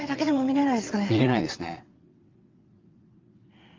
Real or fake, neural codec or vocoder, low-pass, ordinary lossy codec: fake; vocoder, 22.05 kHz, 80 mel bands, WaveNeXt; 7.2 kHz; Opus, 24 kbps